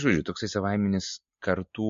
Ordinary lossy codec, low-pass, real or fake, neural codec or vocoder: MP3, 48 kbps; 7.2 kHz; real; none